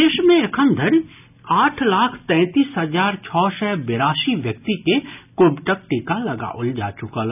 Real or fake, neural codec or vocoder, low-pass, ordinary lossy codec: real; none; 3.6 kHz; none